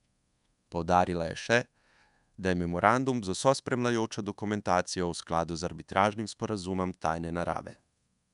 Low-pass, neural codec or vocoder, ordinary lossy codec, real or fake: 10.8 kHz; codec, 24 kHz, 1.2 kbps, DualCodec; none; fake